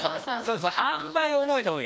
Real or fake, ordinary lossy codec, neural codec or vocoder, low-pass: fake; none; codec, 16 kHz, 1 kbps, FreqCodec, larger model; none